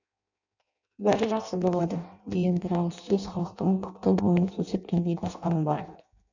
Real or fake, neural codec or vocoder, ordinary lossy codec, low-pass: fake; codec, 16 kHz in and 24 kHz out, 0.6 kbps, FireRedTTS-2 codec; none; 7.2 kHz